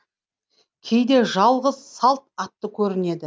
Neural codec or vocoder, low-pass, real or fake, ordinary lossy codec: none; none; real; none